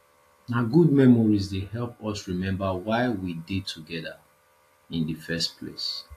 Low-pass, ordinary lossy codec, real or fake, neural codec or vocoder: 14.4 kHz; AAC, 64 kbps; real; none